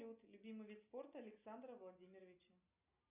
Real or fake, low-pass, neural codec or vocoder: real; 3.6 kHz; none